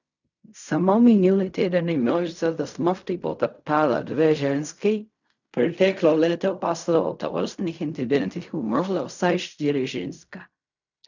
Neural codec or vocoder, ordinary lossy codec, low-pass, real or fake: codec, 16 kHz in and 24 kHz out, 0.4 kbps, LongCat-Audio-Codec, fine tuned four codebook decoder; none; 7.2 kHz; fake